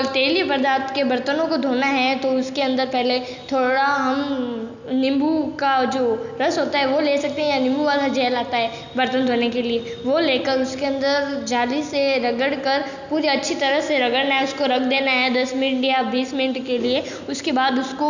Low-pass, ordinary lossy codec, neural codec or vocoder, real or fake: 7.2 kHz; none; none; real